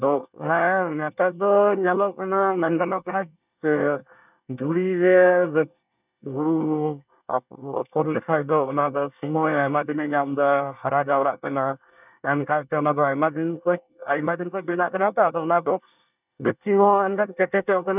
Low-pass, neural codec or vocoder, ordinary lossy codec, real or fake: 3.6 kHz; codec, 24 kHz, 1 kbps, SNAC; none; fake